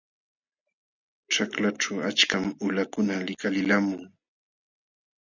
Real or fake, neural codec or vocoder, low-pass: real; none; 7.2 kHz